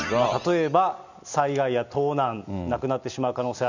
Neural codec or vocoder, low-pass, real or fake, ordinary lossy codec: none; 7.2 kHz; real; none